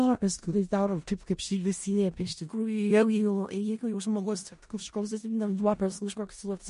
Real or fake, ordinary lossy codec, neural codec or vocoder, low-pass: fake; MP3, 48 kbps; codec, 16 kHz in and 24 kHz out, 0.4 kbps, LongCat-Audio-Codec, four codebook decoder; 10.8 kHz